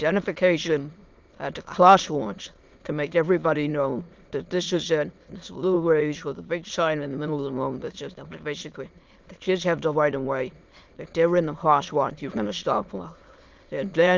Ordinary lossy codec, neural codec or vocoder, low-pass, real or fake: Opus, 32 kbps; autoencoder, 22.05 kHz, a latent of 192 numbers a frame, VITS, trained on many speakers; 7.2 kHz; fake